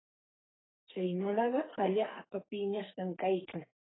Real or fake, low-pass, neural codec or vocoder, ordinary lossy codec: fake; 7.2 kHz; codec, 44.1 kHz, 2.6 kbps, SNAC; AAC, 16 kbps